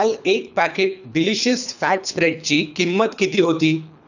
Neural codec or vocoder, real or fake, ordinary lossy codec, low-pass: codec, 24 kHz, 3 kbps, HILCodec; fake; none; 7.2 kHz